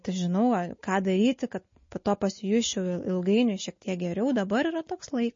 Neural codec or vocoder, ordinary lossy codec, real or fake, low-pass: none; MP3, 32 kbps; real; 7.2 kHz